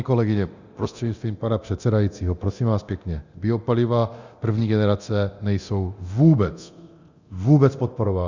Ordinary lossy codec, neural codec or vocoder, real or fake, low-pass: Opus, 64 kbps; codec, 24 kHz, 0.9 kbps, DualCodec; fake; 7.2 kHz